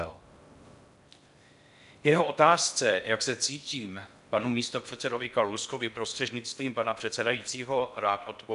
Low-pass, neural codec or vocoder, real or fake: 10.8 kHz; codec, 16 kHz in and 24 kHz out, 0.6 kbps, FocalCodec, streaming, 4096 codes; fake